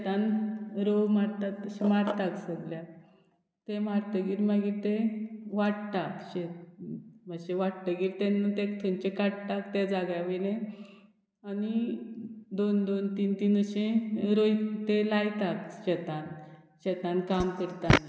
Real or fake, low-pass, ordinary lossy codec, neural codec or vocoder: real; none; none; none